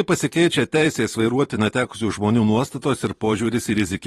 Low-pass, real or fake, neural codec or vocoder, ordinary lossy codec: 19.8 kHz; fake; vocoder, 44.1 kHz, 128 mel bands every 512 samples, BigVGAN v2; AAC, 32 kbps